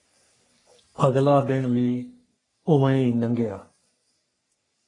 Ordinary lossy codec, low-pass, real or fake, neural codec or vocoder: AAC, 32 kbps; 10.8 kHz; fake; codec, 44.1 kHz, 3.4 kbps, Pupu-Codec